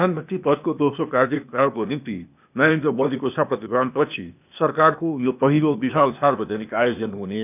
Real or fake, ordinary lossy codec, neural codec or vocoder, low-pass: fake; none; codec, 16 kHz, 0.8 kbps, ZipCodec; 3.6 kHz